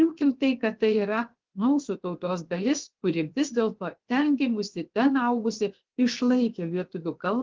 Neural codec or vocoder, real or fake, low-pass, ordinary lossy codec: codec, 16 kHz, 0.7 kbps, FocalCodec; fake; 7.2 kHz; Opus, 16 kbps